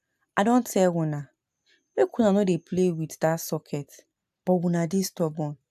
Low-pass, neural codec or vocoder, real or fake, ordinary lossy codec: 14.4 kHz; none; real; AAC, 96 kbps